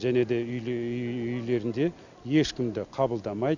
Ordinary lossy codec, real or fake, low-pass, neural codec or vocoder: none; real; 7.2 kHz; none